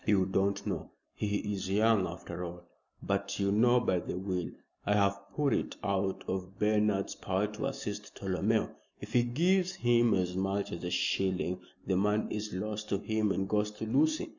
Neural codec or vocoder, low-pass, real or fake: none; 7.2 kHz; real